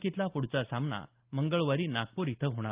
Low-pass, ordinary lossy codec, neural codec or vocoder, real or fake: 3.6 kHz; Opus, 32 kbps; none; real